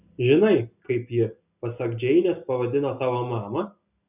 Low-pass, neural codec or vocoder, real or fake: 3.6 kHz; none; real